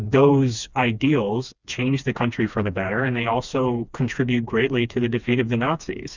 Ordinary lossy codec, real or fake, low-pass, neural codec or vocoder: Opus, 64 kbps; fake; 7.2 kHz; codec, 16 kHz, 2 kbps, FreqCodec, smaller model